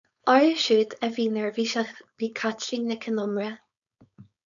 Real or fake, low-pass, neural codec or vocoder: fake; 7.2 kHz; codec, 16 kHz, 4.8 kbps, FACodec